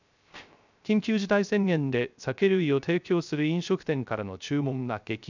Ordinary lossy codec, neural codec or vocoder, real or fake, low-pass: none; codec, 16 kHz, 0.3 kbps, FocalCodec; fake; 7.2 kHz